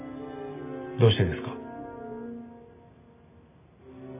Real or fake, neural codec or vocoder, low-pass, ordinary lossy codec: real; none; 3.6 kHz; none